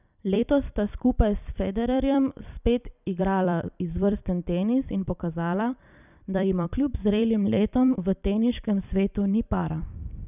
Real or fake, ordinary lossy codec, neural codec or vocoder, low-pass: fake; none; vocoder, 44.1 kHz, 128 mel bands every 256 samples, BigVGAN v2; 3.6 kHz